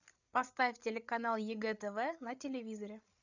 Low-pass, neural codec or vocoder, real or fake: 7.2 kHz; codec, 16 kHz, 8 kbps, FreqCodec, larger model; fake